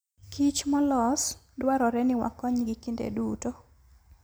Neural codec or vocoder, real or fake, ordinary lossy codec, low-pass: none; real; none; none